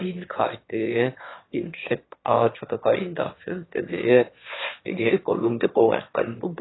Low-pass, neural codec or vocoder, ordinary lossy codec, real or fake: 7.2 kHz; autoencoder, 22.05 kHz, a latent of 192 numbers a frame, VITS, trained on one speaker; AAC, 16 kbps; fake